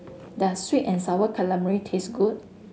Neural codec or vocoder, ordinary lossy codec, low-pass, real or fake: none; none; none; real